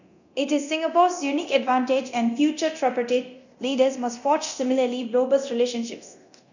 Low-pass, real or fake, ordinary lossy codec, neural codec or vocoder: 7.2 kHz; fake; none; codec, 24 kHz, 0.9 kbps, DualCodec